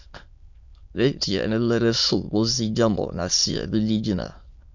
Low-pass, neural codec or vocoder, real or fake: 7.2 kHz; autoencoder, 22.05 kHz, a latent of 192 numbers a frame, VITS, trained on many speakers; fake